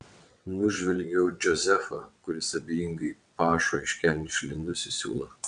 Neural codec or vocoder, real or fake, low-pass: vocoder, 22.05 kHz, 80 mel bands, WaveNeXt; fake; 9.9 kHz